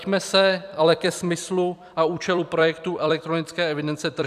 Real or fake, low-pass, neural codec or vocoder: fake; 14.4 kHz; vocoder, 44.1 kHz, 128 mel bands every 256 samples, BigVGAN v2